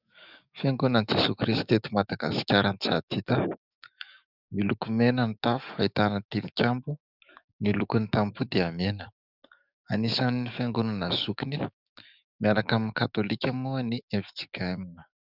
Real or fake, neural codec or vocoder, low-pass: fake; codec, 44.1 kHz, 7.8 kbps, DAC; 5.4 kHz